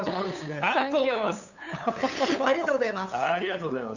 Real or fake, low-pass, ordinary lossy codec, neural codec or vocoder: fake; 7.2 kHz; none; codec, 16 kHz, 8 kbps, FunCodec, trained on LibriTTS, 25 frames a second